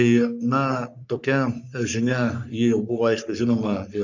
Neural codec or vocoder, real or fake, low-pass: codec, 44.1 kHz, 3.4 kbps, Pupu-Codec; fake; 7.2 kHz